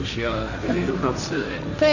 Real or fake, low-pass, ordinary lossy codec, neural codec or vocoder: fake; 7.2 kHz; none; codec, 16 kHz, 1.1 kbps, Voila-Tokenizer